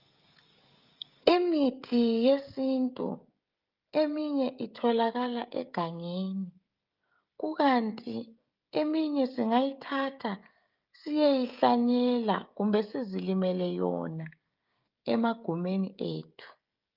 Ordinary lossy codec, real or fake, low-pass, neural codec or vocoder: Opus, 24 kbps; fake; 5.4 kHz; codec, 16 kHz, 16 kbps, FreqCodec, smaller model